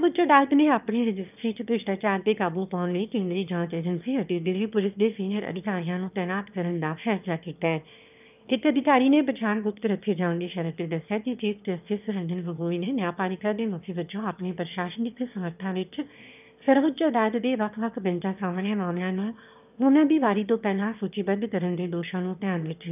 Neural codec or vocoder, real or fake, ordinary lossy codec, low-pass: autoencoder, 22.05 kHz, a latent of 192 numbers a frame, VITS, trained on one speaker; fake; none; 3.6 kHz